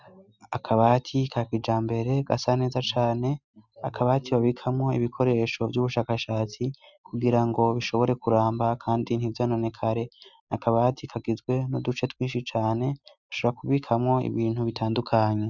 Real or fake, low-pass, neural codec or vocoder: real; 7.2 kHz; none